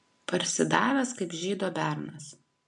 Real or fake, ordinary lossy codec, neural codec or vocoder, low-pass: real; MP3, 64 kbps; none; 10.8 kHz